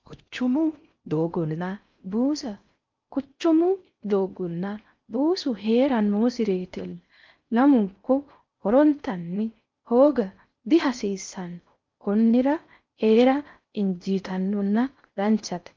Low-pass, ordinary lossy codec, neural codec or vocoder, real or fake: 7.2 kHz; Opus, 24 kbps; codec, 16 kHz in and 24 kHz out, 0.6 kbps, FocalCodec, streaming, 4096 codes; fake